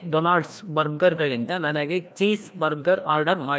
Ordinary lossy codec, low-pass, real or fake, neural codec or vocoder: none; none; fake; codec, 16 kHz, 1 kbps, FreqCodec, larger model